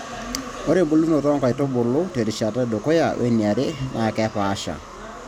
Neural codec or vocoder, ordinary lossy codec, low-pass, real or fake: none; none; 19.8 kHz; real